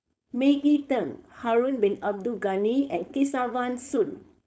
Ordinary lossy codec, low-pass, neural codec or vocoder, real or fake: none; none; codec, 16 kHz, 4.8 kbps, FACodec; fake